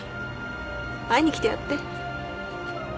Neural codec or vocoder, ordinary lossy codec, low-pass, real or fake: none; none; none; real